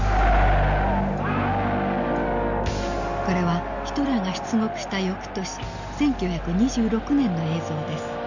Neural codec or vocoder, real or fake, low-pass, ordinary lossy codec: none; real; 7.2 kHz; none